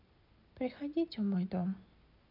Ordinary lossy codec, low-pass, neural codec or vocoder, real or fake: none; 5.4 kHz; none; real